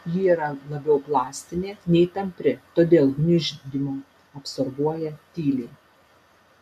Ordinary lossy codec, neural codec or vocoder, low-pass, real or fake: AAC, 96 kbps; none; 14.4 kHz; real